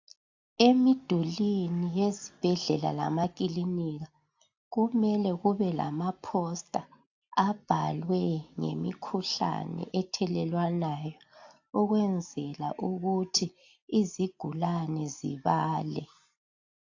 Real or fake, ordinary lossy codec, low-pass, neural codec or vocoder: real; AAC, 48 kbps; 7.2 kHz; none